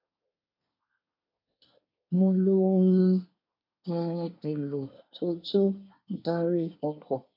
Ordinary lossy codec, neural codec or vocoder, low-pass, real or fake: none; codec, 24 kHz, 1 kbps, SNAC; 5.4 kHz; fake